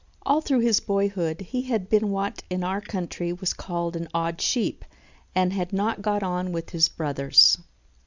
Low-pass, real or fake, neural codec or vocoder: 7.2 kHz; real; none